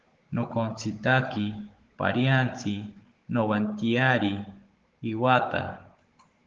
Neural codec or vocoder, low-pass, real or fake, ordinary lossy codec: codec, 16 kHz, 16 kbps, FunCodec, trained on Chinese and English, 50 frames a second; 7.2 kHz; fake; Opus, 16 kbps